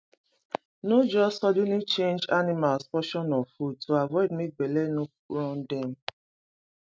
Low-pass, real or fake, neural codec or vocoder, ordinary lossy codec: none; real; none; none